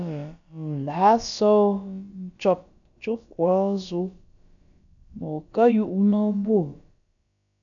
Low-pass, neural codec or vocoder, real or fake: 7.2 kHz; codec, 16 kHz, about 1 kbps, DyCAST, with the encoder's durations; fake